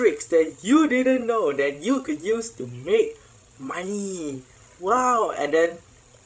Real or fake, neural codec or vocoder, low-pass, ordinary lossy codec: fake; codec, 16 kHz, 16 kbps, FreqCodec, larger model; none; none